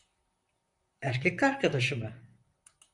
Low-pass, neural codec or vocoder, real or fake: 10.8 kHz; vocoder, 44.1 kHz, 128 mel bands, Pupu-Vocoder; fake